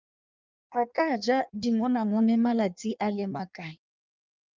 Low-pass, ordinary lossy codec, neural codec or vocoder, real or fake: 7.2 kHz; Opus, 24 kbps; codec, 16 kHz in and 24 kHz out, 1.1 kbps, FireRedTTS-2 codec; fake